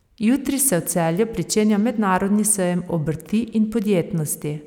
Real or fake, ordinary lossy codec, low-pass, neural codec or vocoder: real; none; 19.8 kHz; none